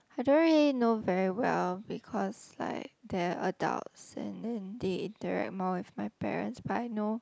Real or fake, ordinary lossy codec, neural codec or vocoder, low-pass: real; none; none; none